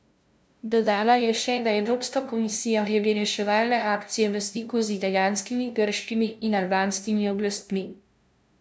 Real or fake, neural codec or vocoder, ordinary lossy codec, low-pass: fake; codec, 16 kHz, 0.5 kbps, FunCodec, trained on LibriTTS, 25 frames a second; none; none